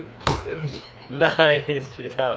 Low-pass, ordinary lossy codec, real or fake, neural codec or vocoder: none; none; fake; codec, 16 kHz, 2 kbps, FreqCodec, larger model